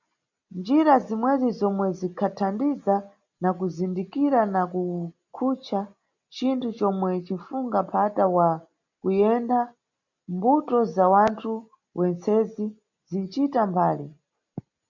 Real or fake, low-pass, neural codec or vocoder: real; 7.2 kHz; none